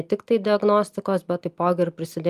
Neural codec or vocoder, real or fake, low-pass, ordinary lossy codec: none; real; 14.4 kHz; Opus, 32 kbps